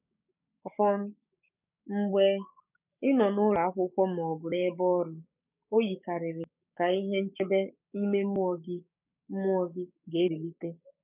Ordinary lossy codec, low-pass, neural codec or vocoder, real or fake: none; 3.6 kHz; codec, 16 kHz, 6 kbps, DAC; fake